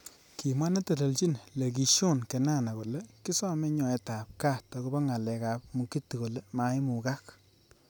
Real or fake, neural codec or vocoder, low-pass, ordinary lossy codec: real; none; none; none